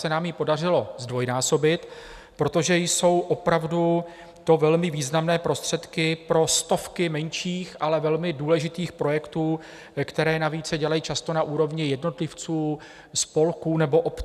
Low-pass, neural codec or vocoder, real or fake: 14.4 kHz; none; real